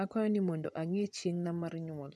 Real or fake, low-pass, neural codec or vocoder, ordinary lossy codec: fake; none; vocoder, 24 kHz, 100 mel bands, Vocos; none